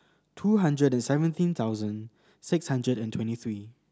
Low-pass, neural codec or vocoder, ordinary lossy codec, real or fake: none; none; none; real